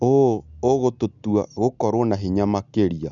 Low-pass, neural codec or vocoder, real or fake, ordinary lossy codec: 7.2 kHz; none; real; none